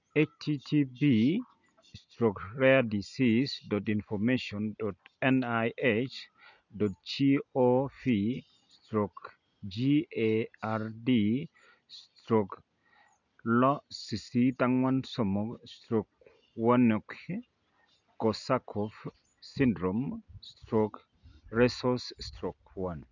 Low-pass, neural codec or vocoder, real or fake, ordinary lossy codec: 7.2 kHz; none; real; none